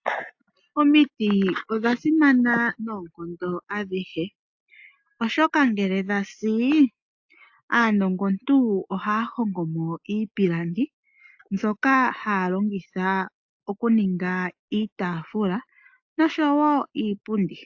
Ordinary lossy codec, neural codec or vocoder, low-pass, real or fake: AAC, 48 kbps; none; 7.2 kHz; real